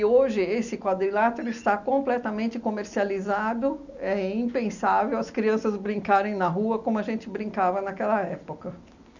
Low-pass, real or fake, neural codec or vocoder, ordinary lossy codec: 7.2 kHz; real; none; none